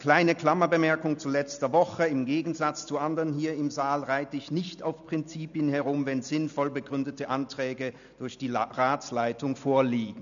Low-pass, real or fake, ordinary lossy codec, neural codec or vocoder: 7.2 kHz; real; none; none